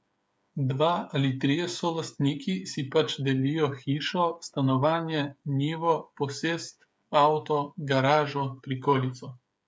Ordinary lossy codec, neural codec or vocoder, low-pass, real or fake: none; codec, 16 kHz, 8 kbps, FreqCodec, smaller model; none; fake